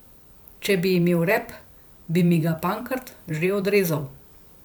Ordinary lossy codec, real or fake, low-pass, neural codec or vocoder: none; fake; none; vocoder, 44.1 kHz, 128 mel bands every 512 samples, BigVGAN v2